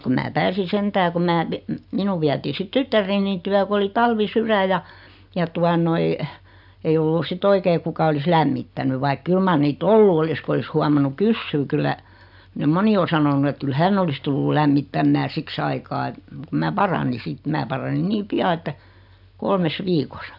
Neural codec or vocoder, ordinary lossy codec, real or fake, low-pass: none; none; real; 5.4 kHz